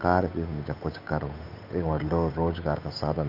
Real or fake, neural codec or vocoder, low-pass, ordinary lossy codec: fake; codec, 16 kHz, 8 kbps, FunCodec, trained on Chinese and English, 25 frames a second; 5.4 kHz; none